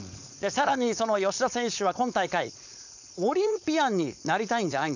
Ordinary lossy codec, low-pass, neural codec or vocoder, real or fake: none; 7.2 kHz; codec, 16 kHz, 4.8 kbps, FACodec; fake